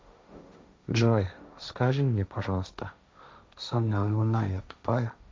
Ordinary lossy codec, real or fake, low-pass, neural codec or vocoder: none; fake; none; codec, 16 kHz, 1.1 kbps, Voila-Tokenizer